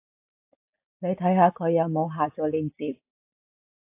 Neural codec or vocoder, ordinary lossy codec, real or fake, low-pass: none; AAC, 24 kbps; real; 3.6 kHz